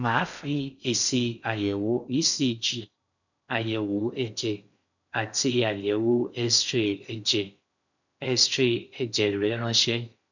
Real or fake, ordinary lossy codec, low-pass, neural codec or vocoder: fake; none; 7.2 kHz; codec, 16 kHz in and 24 kHz out, 0.6 kbps, FocalCodec, streaming, 4096 codes